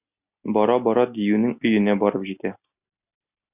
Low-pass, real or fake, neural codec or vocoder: 3.6 kHz; real; none